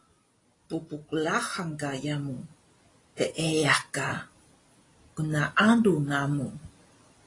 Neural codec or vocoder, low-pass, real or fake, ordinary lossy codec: none; 10.8 kHz; real; AAC, 32 kbps